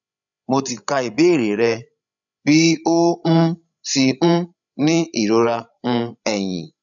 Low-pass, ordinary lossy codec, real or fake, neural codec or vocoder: 7.2 kHz; none; fake; codec, 16 kHz, 16 kbps, FreqCodec, larger model